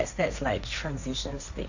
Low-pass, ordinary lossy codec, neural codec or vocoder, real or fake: 7.2 kHz; none; codec, 16 kHz, 1.1 kbps, Voila-Tokenizer; fake